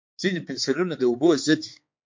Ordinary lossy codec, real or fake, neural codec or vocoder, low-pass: MP3, 48 kbps; fake; codec, 16 kHz, 4 kbps, X-Codec, HuBERT features, trained on balanced general audio; 7.2 kHz